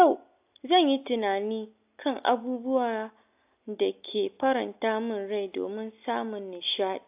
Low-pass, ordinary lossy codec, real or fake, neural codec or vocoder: 3.6 kHz; AAC, 32 kbps; real; none